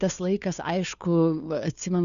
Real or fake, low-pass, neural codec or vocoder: fake; 7.2 kHz; codec, 16 kHz, 2 kbps, FunCodec, trained on Chinese and English, 25 frames a second